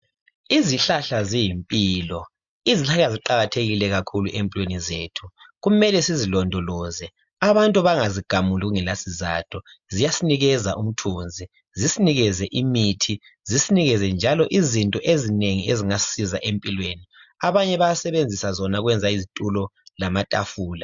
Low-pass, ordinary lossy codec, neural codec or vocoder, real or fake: 7.2 kHz; MP3, 64 kbps; none; real